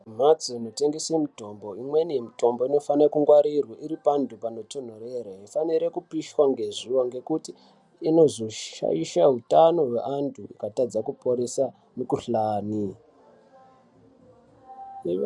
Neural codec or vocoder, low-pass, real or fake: none; 10.8 kHz; real